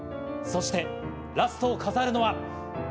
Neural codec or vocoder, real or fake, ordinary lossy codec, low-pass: none; real; none; none